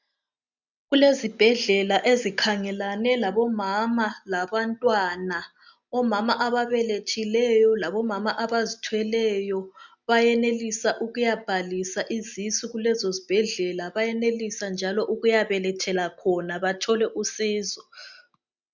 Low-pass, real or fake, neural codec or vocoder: 7.2 kHz; real; none